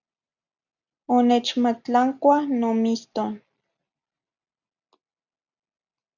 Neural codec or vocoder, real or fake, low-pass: none; real; 7.2 kHz